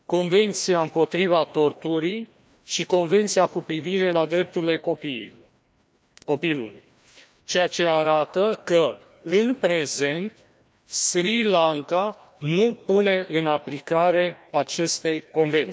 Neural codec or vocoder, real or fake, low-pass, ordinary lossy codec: codec, 16 kHz, 1 kbps, FreqCodec, larger model; fake; none; none